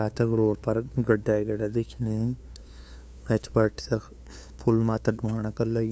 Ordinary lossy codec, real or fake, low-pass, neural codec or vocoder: none; fake; none; codec, 16 kHz, 2 kbps, FunCodec, trained on LibriTTS, 25 frames a second